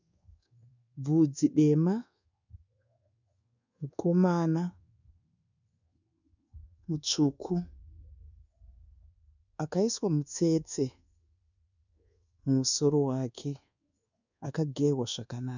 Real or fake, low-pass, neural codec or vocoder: fake; 7.2 kHz; codec, 24 kHz, 1.2 kbps, DualCodec